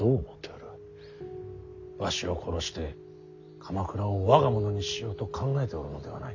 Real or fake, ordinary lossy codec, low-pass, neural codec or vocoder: real; none; 7.2 kHz; none